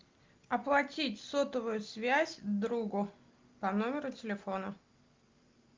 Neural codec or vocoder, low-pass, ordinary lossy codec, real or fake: none; 7.2 kHz; Opus, 32 kbps; real